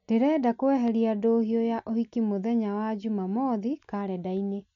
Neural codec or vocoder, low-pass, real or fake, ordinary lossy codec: none; 7.2 kHz; real; none